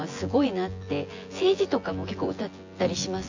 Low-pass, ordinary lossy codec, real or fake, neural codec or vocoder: 7.2 kHz; AAC, 48 kbps; fake; vocoder, 24 kHz, 100 mel bands, Vocos